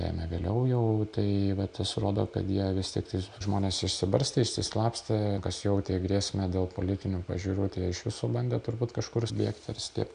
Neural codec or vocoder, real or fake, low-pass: none; real; 9.9 kHz